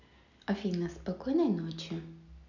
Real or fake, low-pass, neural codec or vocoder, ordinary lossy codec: real; 7.2 kHz; none; none